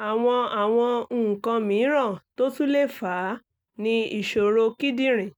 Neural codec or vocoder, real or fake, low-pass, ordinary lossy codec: none; real; none; none